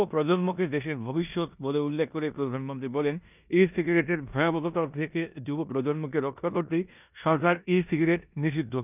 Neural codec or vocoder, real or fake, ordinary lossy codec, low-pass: codec, 16 kHz in and 24 kHz out, 0.9 kbps, LongCat-Audio-Codec, four codebook decoder; fake; none; 3.6 kHz